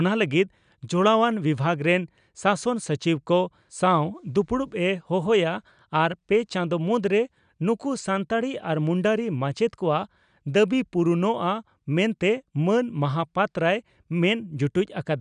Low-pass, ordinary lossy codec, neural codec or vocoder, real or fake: 9.9 kHz; none; none; real